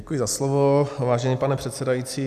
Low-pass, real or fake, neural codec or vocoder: 14.4 kHz; real; none